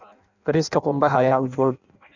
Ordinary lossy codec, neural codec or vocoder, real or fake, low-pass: none; codec, 16 kHz in and 24 kHz out, 0.6 kbps, FireRedTTS-2 codec; fake; 7.2 kHz